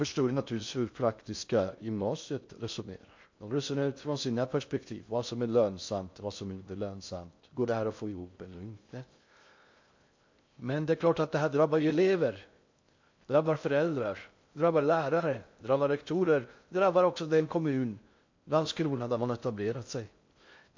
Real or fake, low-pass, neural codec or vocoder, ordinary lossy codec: fake; 7.2 kHz; codec, 16 kHz in and 24 kHz out, 0.6 kbps, FocalCodec, streaming, 2048 codes; MP3, 48 kbps